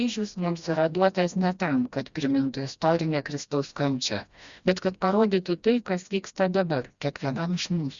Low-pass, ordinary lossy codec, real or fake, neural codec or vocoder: 7.2 kHz; Opus, 64 kbps; fake; codec, 16 kHz, 1 kbps, FreqCodec, smaller model